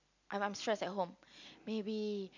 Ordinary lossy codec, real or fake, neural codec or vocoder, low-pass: none; real; none; 7.2 kHz